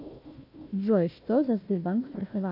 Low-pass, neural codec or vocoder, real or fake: 5.4 kHz; codec, 16 kHz, 1 kbps, FunCodec, trained on Chinese and English, 50 frames a second; fake